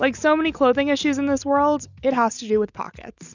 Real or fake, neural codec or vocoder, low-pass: real; none; 7.2 kHz